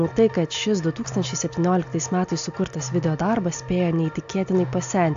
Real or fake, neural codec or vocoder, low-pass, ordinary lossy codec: real; none; 7.2 kHz; AAC, 64 kbps